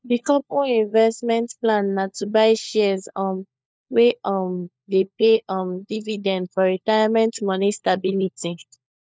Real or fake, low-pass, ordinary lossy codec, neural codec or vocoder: fake; none; none; codec, 16 kHz, 4 kbps, FunCodec, trained on LibriTTS, 50 frames a second